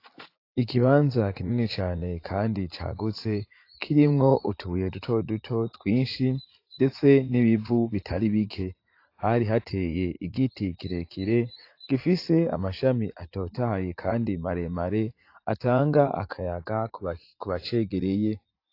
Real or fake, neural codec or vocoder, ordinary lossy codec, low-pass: fake; vocoder, 44.1 kHz, 80 mel bands, Vocos; AAC, 32 kbps; 5.4 kHz